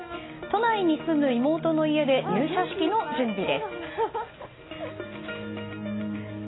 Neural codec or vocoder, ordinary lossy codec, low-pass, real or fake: none; AAC, 16 kbps; 7.2 kHz; real